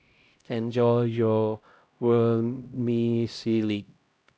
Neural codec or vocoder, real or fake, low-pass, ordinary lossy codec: codec, 16 kHz, 0.5 kbps, X-Codec, HuBERT features, trained on LibriSpeech; fake; none; none